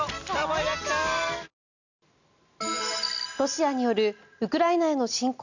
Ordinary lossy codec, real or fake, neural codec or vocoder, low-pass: none; real; none; 7.2 kHz